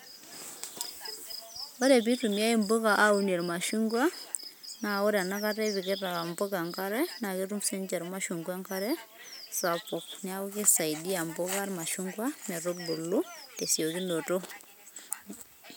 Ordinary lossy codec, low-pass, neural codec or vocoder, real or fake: none; none; none; real